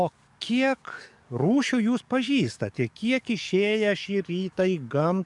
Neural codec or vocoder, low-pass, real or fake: codec, 44.1 kHz, 7.8 kbps, DAC; 10.8 kHz; fake